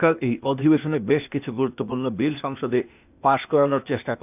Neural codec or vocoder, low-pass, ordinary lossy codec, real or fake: codec, 16 kHz, 0.8 kbps, ZipCodec; 3.6 kHz; none; fake